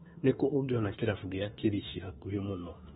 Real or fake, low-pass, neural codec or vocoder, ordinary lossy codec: fake; 19.8 kHz; autoencoder, 48 kHz, 32 numbers a frame, DAC-VAE, trained on Japanese speech; AAC, 16 kbps